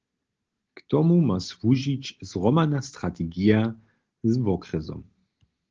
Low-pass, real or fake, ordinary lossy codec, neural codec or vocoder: 7.2 kHz; real; Opus, 16 kbps; none